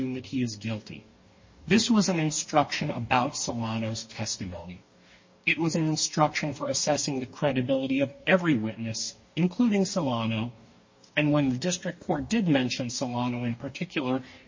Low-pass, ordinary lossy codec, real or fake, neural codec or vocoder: 7.2 kHz; MP3, 32 kbps; fake; codec, 44.1 kHz, 2.6 kbps, DAC